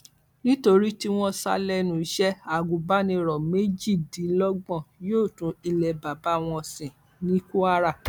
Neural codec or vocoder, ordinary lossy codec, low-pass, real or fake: none; none; 19.8 kHz; real